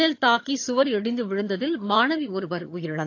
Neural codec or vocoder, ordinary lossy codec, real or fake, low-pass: vocoder, 22.05 kHz, 80 mel bands, HiFi-GAN; AAC, 48 kbps; fake; 7.2 kHz